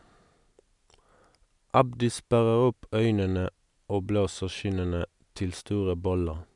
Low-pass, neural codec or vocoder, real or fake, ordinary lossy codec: 10.8 kHz; none; real; none